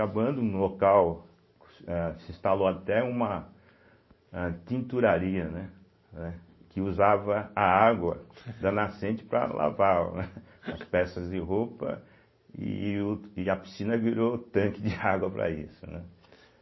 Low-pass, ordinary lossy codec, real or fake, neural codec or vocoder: 7.2 kHz; MP3, 24 kbps; real; none